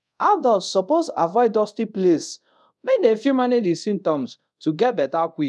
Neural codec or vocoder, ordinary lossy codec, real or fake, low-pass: codec, 24 kHz, 0.5 kbps, DualCodec; none; fake; none